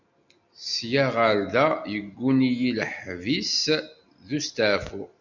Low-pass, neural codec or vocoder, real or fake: 7.2 kHz; none; real